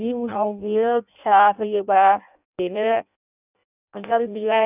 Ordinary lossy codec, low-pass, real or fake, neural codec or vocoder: none; 3.6 kHz; fake; codec, 16 kHz in and 24 kHz out, 0.6 kbps, FireRedTTS-2 codec